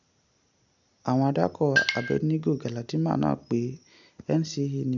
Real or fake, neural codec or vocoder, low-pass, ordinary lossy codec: real; none; 7.2 kHz; none